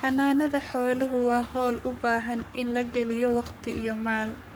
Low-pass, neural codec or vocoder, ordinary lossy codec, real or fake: none; codec, 44.1 kHz, 3.4 kbps, Pupu-Codec; none; fake